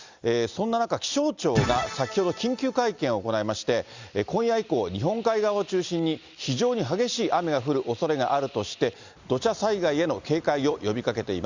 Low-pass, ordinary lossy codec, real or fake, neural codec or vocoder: 7.2 kHz; Opus, 64 kbps; real; none